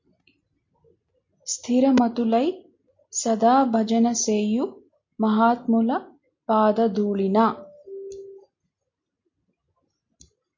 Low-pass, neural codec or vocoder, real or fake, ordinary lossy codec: 7.2 kHz; none; real; MP3, 48 kbps